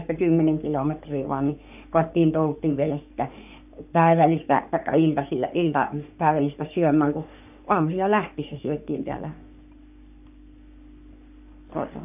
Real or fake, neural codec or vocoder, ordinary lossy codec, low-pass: fake; codec, 44.1 kHz, 3.4 kbps, Pupu-Codec; none; 3.6 kHz